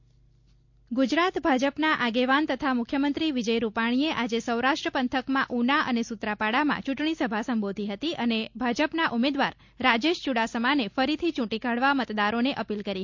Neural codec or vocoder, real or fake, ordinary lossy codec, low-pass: none; real; MP3, 48 kbps; 7.2 kHz